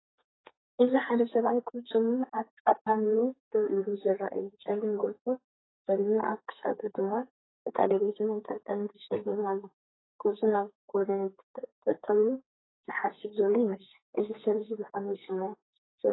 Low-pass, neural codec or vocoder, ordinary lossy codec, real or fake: 7.2 kHz; codec, 32 kHz, 1.9 kbps, SNAC; AAC, 16 kbps; fake